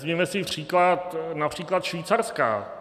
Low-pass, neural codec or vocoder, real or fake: 14.4 kHz; none; real